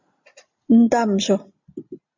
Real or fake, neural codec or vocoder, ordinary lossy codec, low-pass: real; none; MP3, 64 kbps; 7.2 kHz